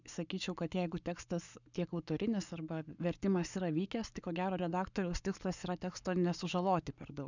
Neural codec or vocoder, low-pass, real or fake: codec, 44.1 kHz, 7.8 kbps, Pupu-Codec; 7.2 kHz; fake